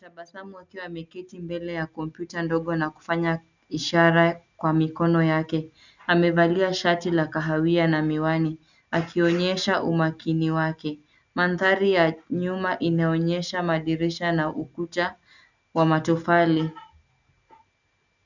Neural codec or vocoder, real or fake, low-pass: none; real; 7.2 kHz